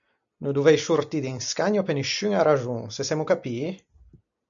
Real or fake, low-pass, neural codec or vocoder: real; 7.2 kHz; none